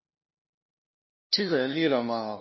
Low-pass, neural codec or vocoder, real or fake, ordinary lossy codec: 7.2 kHz; codec, 16 kHz, 0.5 kbps, FunCodec, trained on LibriTTS, 25 frames a second; fake; MP3, 24 kbps